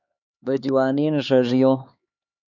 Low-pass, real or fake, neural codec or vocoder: 7.2 kHz; fake; codec, 16 kHz, 4 kbps, X-Codec, HuBERT features, trained on LibriSpeech